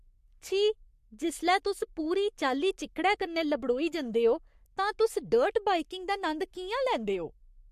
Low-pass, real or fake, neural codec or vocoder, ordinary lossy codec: 14.4 kHz; fake; codec, 44.1 kHz, 7.8 kbps, Pupu-Codec; MP3, 64 kbps